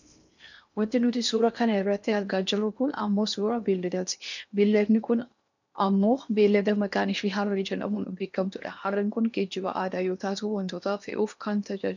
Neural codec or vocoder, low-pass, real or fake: codec, 16 kHz in and 24 kHz out, 0.8 kbps, FocalCodec, streaming, 65536 codes; 7.2 kHz; fake